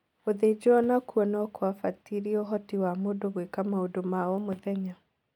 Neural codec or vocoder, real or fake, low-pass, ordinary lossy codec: none; real; 19.8 kHz; none